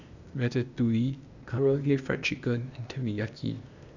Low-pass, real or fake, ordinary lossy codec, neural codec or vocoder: 7.2 kHz; fake; none; codec, 16 kHz, 0.8 kbps, ZipCodec